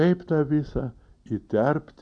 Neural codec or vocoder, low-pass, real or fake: none; 9.9 kHz; real